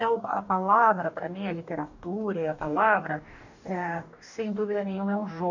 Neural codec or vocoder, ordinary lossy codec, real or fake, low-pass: codec, 44.1 kHz, 2.6 kbps, DAC; none; fake; 7.2 kHz